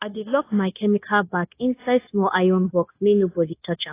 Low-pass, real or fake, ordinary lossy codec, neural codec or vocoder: 3.6 kHz; fake; AAC, 24 kbps; codec, 16 kHz, 0.9 kbps, LongCat-Audio-Codec